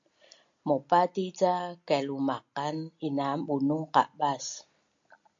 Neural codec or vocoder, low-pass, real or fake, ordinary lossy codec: none; 7.2 kHz; real; AAC, 64 kbps